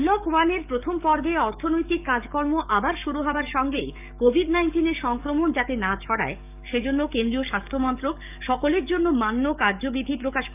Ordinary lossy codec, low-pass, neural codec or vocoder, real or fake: none; 3.6 kHz; codec, 44.1 kHz, 7.8 kbps, DAC; fake